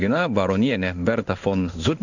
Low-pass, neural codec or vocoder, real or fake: 7.2 kHz; codec, 16 kHz in and 24 kHz out, 1 kbps, XY-Tokenizer; fake